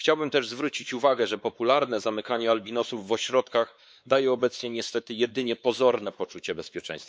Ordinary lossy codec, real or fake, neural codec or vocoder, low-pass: none; fake; codec, 16 kHz, 4 kbps, X-Codec, WavLM features, trained on Multilingual LibriSpeech; none